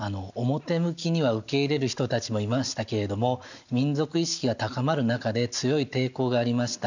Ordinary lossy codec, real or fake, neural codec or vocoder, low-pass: none; real; none; 7.2 kHz